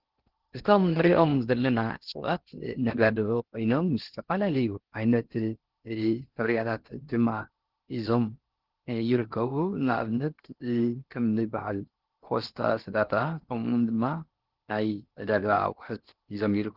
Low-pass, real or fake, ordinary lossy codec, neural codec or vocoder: 5.4 kHz; fake; Opus, 16 kbps; codec, 16 kHz in and 24 kHz out, 0.6 kbps, FocalCodec, streaming, 2048 codes